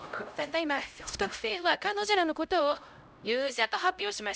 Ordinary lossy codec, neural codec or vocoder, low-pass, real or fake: none; codec, 16 kHz, 0.5 kbps, X-Codec, HuBERT features, trained on LibriSpeech; none; fake